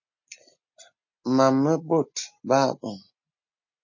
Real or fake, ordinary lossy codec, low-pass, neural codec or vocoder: real; MP3, 32 kbps; 7.2 kHz; none